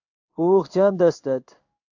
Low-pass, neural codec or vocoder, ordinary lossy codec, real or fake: 7.2 kHz; codec, 16 kHz in and 24 kHz out, 1 kbps, XY-Tokenizer; AAC, 48 kbps; fake